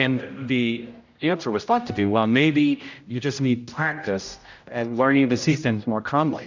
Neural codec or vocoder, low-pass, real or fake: codec, 16 kHz, 0.5 kbps, X-Codec, HuBERT features, trained on general audio; 7.2 kHz; fake